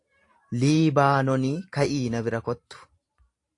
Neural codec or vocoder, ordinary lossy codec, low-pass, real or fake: vocoder, 44.1 kHz, 128 mel bands every 512 samples, BigVGAN v2; AAC, 48 kbps; 10.8 kHz; fake